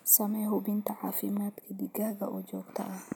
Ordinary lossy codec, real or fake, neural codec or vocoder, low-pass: none; real; none; none